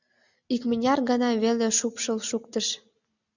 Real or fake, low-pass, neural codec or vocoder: real; 7.2 kHz; none